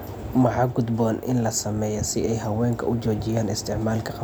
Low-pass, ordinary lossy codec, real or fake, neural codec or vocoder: none; none; real; none